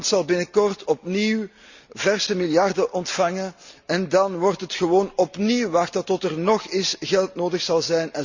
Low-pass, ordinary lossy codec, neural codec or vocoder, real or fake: 7.2 kHz; Opus, 64 kbps; none; real